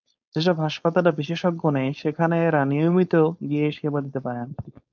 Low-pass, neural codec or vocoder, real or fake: 7.2 kHz; codec, 16 kHz, 4.8 kbps, FACodec; fake